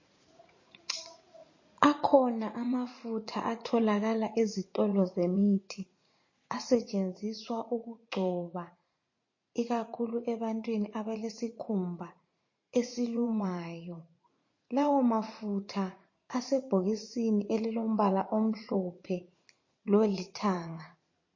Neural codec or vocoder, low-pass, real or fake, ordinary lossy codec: vocoder, 44.1 kHz, 80 mel bands, Vocos; 7.2 kHz; fake; MP3, 32 kbps